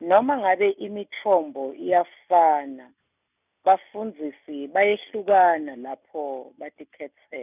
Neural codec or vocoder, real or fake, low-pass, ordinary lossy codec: none; real; 3.6 kHz; none